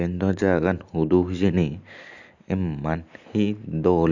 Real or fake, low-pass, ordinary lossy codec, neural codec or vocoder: real; 7.2 kHz; none; none